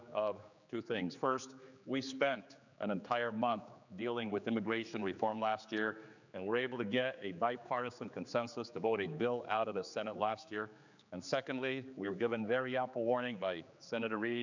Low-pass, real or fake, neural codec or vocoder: 7.2 kHz; fake; codec, 16 kHz, 4 kbps, X-Codec, HuBERT features, trained on general audio